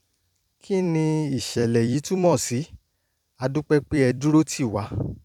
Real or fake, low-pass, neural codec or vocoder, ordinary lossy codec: fake; 19.8 kHz; vocoder, 44.1 kHz, 128 mel bands every 256 samples, BigVGAN v2; none